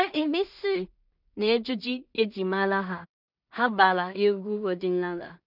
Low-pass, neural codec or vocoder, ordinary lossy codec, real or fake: 5.4 kHz; codec, 16 kHz in and 24 kHz out, 0.4 kbps, LongCat-Audio-Codec, two codebook decoder; none; fake